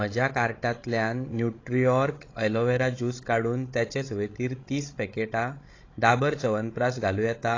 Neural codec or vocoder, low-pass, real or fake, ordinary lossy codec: codec, 16 kHz, 16 kbps, FreqCodec, larger model; 7.2 kHz; fake; AAC, 32 kbps